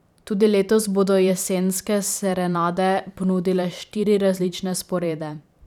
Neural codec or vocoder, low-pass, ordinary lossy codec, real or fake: vocoder, 44.1 kHz, 128 mel bands every 512 samples, BigVGAN v2; 19.8 kHz; none; fake